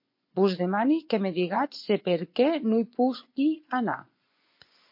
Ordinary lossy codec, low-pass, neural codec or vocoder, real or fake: MP3, 32 kbps; 5.4 kHz; vocoder, 24 kHz, 100 mel bands, Vocos; fake